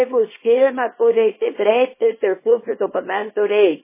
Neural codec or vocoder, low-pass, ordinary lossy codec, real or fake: codec, 24 kHz, 0.9 kbps, WavTokenizer, small release; 3.6 kHz; MP3, 16 kbps; fake